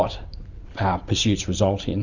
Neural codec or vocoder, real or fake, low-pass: vocoder, 44.1 kHz, 128 mel bands every 512 samples, BigVGAN v2; fake; 7.2 kHz